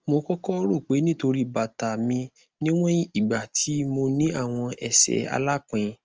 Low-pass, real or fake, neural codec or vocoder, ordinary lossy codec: 7.2 kHz; real; none; Opus, 24 kbps